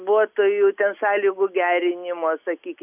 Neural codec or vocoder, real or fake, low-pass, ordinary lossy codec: none; real; 3.6 kHz; AAC, 32 kbps